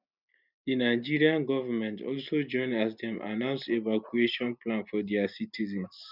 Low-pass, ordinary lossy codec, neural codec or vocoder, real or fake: 5.4 kHz; none; none; real